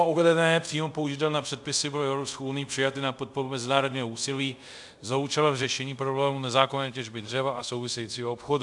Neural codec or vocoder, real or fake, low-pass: codec, 24 kHz, 0.5 kbps, DualCodec; fake; 10.8 kHz